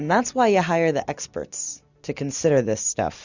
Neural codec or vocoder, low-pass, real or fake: none; 7.2 kHz; real